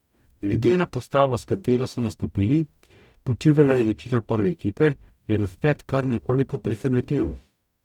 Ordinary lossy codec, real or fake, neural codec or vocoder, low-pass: none; fake; codec, 44.1 kHz, 0.9 kbps, DAC; 19.8 kHz